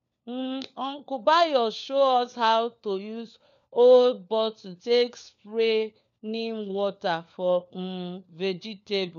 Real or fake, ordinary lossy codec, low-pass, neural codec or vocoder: fake; none; 7.2 kHz; codec, 16 kHz, 4 kbps, FunCodec, trained on LibriTTS, 50 frames a second